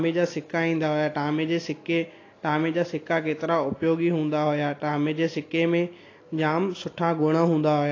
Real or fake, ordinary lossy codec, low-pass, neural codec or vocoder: real; AAC, 32 kbps; 7.2 kHz; none